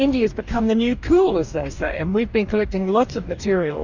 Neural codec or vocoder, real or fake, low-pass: codec, 44.1 kHz, 2.6 kbps, DAC; fake; 7.2 kHz